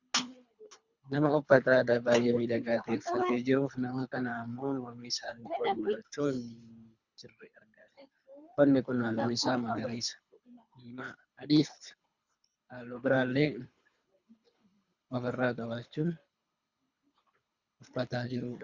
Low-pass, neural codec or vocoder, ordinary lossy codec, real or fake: 7.2 kHz; codec, 24 kHz, 3 kbps, HILCodec; Opus, 64 kbps; fake